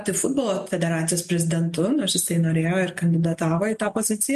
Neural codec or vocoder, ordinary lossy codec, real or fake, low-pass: none; MP3, 64 kbps; real; 14.4 kHz